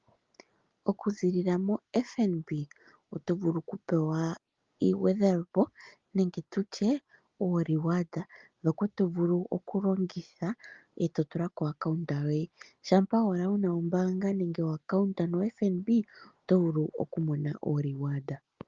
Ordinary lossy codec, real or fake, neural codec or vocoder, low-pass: Opus, 32 kbps; real; none; 7.2 kHz